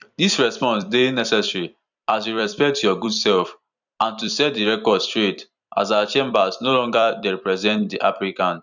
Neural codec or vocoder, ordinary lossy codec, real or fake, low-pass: vocoder, 44.1 kHz, 128 mel bands every 256 samples, BigVGAN v2; none; fake; 7.2 kHz